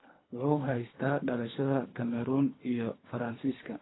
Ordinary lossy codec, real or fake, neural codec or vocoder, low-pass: AAC, 16 kbps; fake; codec, 24 kHz, 3 kbps, HILCodec; 7.2 kHz